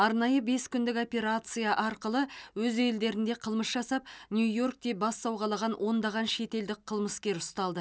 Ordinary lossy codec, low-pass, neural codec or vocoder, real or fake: none; none; none; real